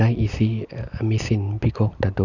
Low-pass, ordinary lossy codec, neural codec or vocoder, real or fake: 7.2 kHz; none; none; real